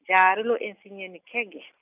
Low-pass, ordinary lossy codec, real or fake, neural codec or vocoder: 3.6 kHz; none; real; none